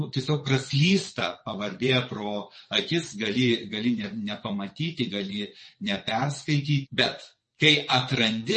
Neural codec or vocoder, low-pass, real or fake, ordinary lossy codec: vocoder, 22.05 kHz, 80 mel bands, WaveNeXt; 9.9 kHz; fake; MP3, 32 kbps